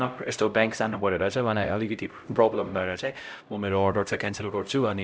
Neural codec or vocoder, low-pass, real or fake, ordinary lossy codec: codec, 16 kHz, 0.5 kbps, X-Codec, HuBERT features, trained on LibriSpeech; none; fake; none